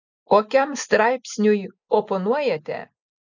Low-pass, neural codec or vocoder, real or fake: 7.2 kHz; none; real